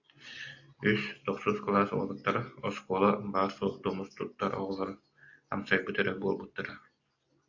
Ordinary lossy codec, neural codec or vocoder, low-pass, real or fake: AAC, 48 kbps; none; 7.2 kHz; real